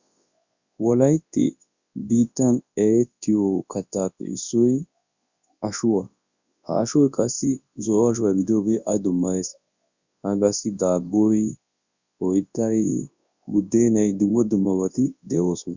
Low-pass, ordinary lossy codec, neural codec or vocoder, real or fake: 7.2 kHz; Opus, 64 kbps; codec, 24 kHz, 0.9 kbps, WavTokenizer, large speech release; fake